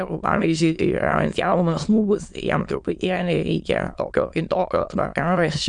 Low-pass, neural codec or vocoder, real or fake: 9.9 kHz; autoencoder, 22.05 kHz, a latent of 192 numbers a frame, VITS, trained on many speakers; fake